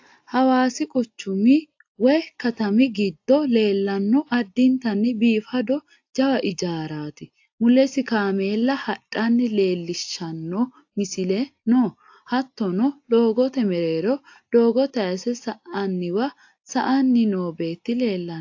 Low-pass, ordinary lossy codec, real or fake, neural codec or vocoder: 7.2 kHz; AAC, 48 kbps; real; none